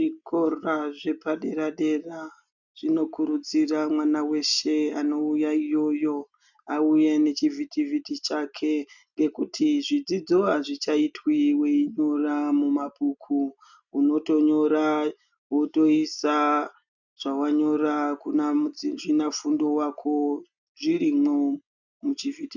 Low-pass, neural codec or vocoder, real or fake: 7.2 kHz; none; real